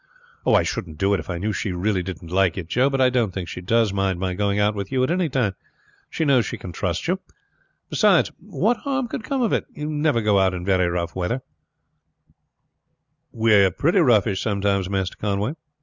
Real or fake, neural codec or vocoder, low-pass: real; none; 7.2 kHz